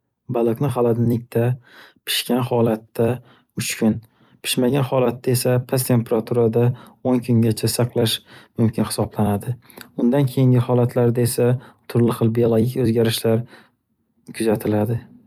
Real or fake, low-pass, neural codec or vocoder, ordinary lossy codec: fake; 19.8 kHz; vocoder, 44.1 kHz, 128 mel bands every 256 samples, BigVGAN v2; none